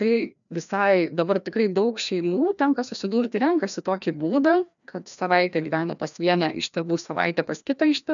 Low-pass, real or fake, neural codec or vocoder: 7.2 kHz; fake; codec, 16 kHz, 1 kbps, FreqCodec, larger model